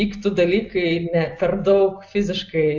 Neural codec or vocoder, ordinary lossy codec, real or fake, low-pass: none; AAC, 48 kbps; real; 7.2 kHz